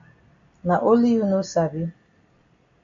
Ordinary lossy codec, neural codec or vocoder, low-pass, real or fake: MP3, 48 kbps; none; 7.2 kHz; real